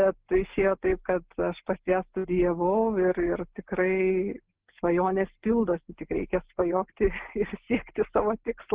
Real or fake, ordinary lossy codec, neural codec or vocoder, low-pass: real; Opus, 16 kbps; none; 3.6 kHz